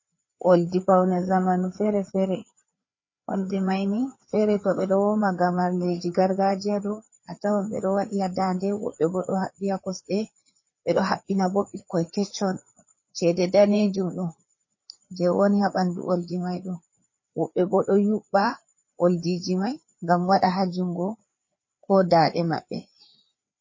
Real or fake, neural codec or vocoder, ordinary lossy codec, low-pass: fake; codec, 16 kHz, 4 kbps, FreqCodec, larger model; MP3, 32 kbps; 7.2 kHz